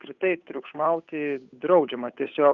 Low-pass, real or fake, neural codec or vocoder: 7.2 kHz; fake; codec, 16 kHz, 8 kbps, FunCodec, trained on Chinese and English, 25 frames a second